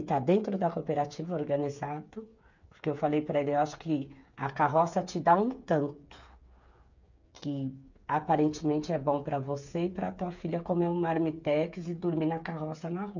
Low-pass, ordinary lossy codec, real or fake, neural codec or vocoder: 7.2 kHz; none; fake; codec, 16 kHz, 8 kbps, FreqCodec, smaller model